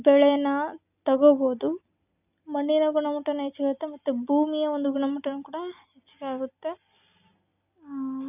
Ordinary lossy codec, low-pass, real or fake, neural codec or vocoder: none; 3.6 kHz; real; none